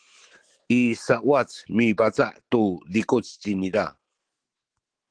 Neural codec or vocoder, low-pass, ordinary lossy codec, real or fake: autoencoder, 48 kHz, 128 numbers a frame, DAC-VAE, trained on Japanese speech; 9.9 kHz; Opus, 16 kbps; fake